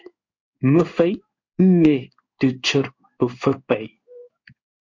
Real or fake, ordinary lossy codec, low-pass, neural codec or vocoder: fake; MP3, 48 kbps; 7.2 kHz; codec, 16 kHz in and 24 kHz out, 1 kbps, XY-Tokenizer